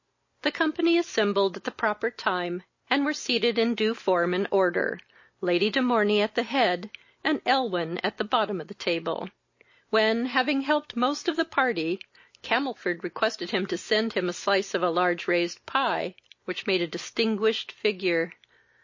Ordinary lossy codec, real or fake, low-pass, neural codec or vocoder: MP3, 32 kbps; real; 7.2 kHz; none